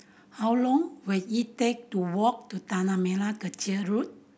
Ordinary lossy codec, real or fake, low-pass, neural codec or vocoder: none; real; none; none